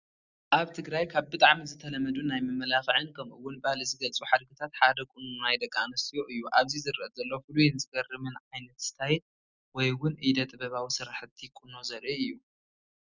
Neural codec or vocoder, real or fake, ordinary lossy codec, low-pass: none; real; Opus, 64 kbps; 7.2 kHz